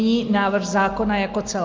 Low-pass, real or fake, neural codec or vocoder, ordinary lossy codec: 7.2 kHz; real; none; Opus, 24 kbps